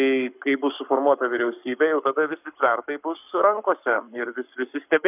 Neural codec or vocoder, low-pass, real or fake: codec, 44.1 kHz, 7.8 kbps, Pupu-Codec; 3.6 kHz; fake